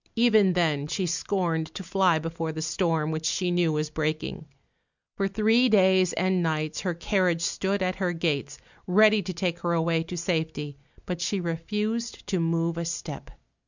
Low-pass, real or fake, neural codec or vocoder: 7.2 kHz; real; none